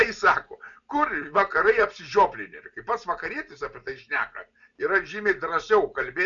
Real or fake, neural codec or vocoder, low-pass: real; none; 7.2 kHz